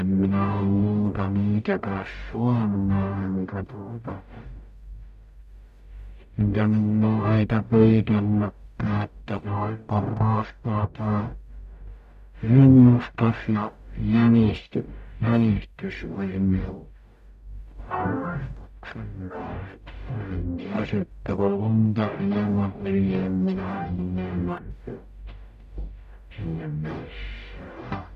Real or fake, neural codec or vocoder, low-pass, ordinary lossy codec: fake; codec, 44.1 kHz, 0.9 kbps, DAC; 14.4 kHz; none